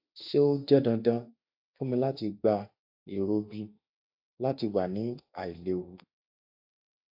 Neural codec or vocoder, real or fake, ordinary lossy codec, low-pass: autoencoder, 48 kHz, 32 numbers a frame, DAC-VAE, trained on Japanese speech; fake; AAC, 48 kbps; 5.4 kHz